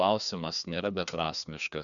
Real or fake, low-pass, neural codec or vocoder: fake; 7.2 kHz; codec, 16 kHz, 2 kbps, FreqCodec, larger model